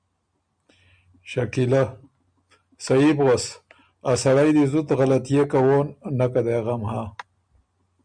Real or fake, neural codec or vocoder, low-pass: real; none; 9.9 kHz